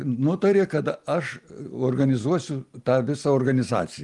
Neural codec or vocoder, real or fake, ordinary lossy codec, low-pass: none; real; Opus, 32 kbps; 10.8 kHz